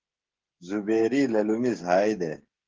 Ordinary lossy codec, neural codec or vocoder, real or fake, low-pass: Opus, 16 kbps; codec, 16 kHz, 16 kbps, FreqCodec, smaller model; fake; 7.2 kHz